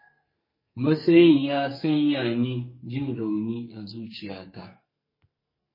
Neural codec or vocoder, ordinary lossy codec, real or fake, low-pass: codec, 44.1 kHz, 2.6 kbps, SNAC; MP3, 24 kbps; fake; 5.4 kHz